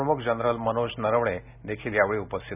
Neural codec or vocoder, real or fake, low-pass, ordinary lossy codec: none; real; 3.6 kHz; none